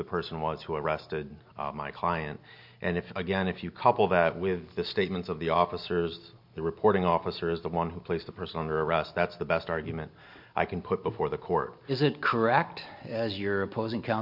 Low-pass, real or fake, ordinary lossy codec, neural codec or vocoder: 5.4 kHz; real; MP3, 48 kbps; none